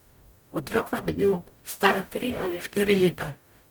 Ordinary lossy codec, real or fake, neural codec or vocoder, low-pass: none; fake; codec, 44.1 kHz, 0.9 kbps, DAC; none